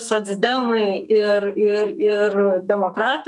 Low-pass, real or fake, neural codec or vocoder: 10.8 kHz; fake; codec, 32 kHz, 1.9 kbps, SNAC